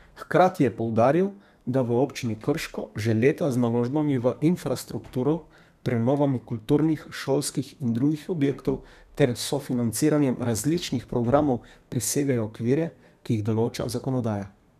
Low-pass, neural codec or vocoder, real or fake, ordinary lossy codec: 14.4 kHz; codec, 32 kHz, 1.9 kbps, SNAC; fake; none